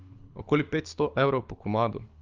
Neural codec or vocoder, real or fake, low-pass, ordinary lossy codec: codec, 24 kHz, 6 kbps, HILCodec; fake; 7.2 kHz; Opus, 32 kbps